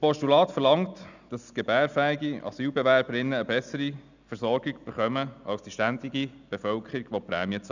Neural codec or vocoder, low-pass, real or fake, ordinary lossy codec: none; 7.2 kHz; real; none